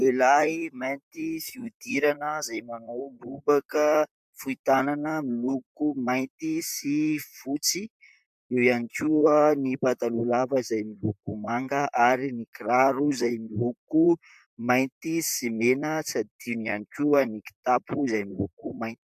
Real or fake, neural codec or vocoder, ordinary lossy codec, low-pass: fake; vocoder, 44.1 kHz, 128 mel bands, Pupu-Vocoder; MP3, 96 kbps; 14.4 kHz